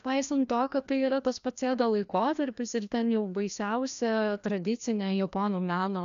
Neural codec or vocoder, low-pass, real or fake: codec, 16 kHz, 1 kbps, FreqCodec, larger model; 7.2 kHz; fake